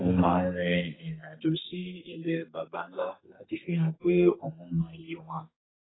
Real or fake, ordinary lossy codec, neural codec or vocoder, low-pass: fake; AAC, 16 kbps; codec, 32 kHz, 1.9 kbps, SNAC; 7.2 kHz